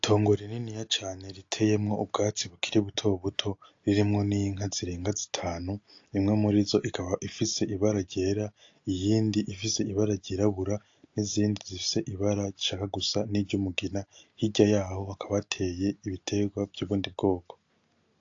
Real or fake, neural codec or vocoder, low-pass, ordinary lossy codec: real; none; 7.2 kHz; AAC, 48 kbps